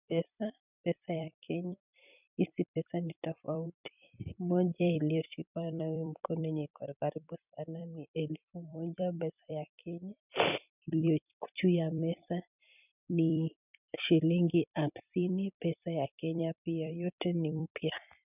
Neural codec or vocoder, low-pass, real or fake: vocoder, 44.1 kHz, 128 mel bands every 256 samples, BigVGAN v2; 3.6 kHz; fake